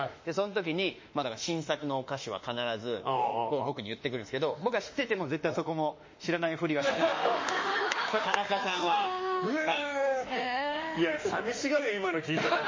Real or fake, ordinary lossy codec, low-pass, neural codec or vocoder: fake; MP3, 32 kbps; 7.2 kHz; autoencoder, 48 kHz, 32 numbers a frame, DAC-VAE, trained on Japanese speech